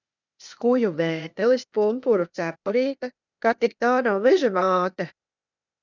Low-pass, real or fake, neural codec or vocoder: 7.2 kHz; fake; codec, 16 kHz, 0.8 kbps, ZipCodec